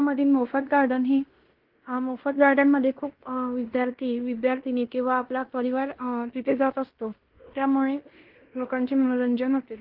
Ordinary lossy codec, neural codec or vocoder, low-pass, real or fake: Opus, 16 kbps; codec, 16 kHz in and 24 kHz out, 0.9 kbps, LongCat-Audio-Codec, fine tuned four codebook decoder; 5.4 kHz; fake